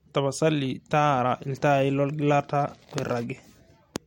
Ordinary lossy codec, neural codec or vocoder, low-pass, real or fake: MP3, 64 kbps; none; 19.8 kHz; real